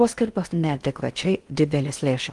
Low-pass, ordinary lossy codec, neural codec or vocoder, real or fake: 10.8 kHz; Opus, 24 kbps; codec, 16 kHz in and 24 kHz out, 0.6 kbps, FocalCodec, streaming, 4096 codes; fake